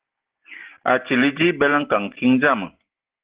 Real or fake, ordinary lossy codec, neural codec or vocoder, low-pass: real; Opus, 16 kbps; none; 3.6 kHz